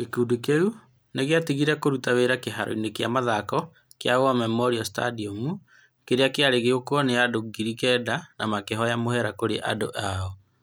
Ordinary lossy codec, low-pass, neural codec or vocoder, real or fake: none; none; none; real